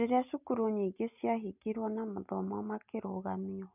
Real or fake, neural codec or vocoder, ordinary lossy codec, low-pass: real; none; none; 3.6 kHz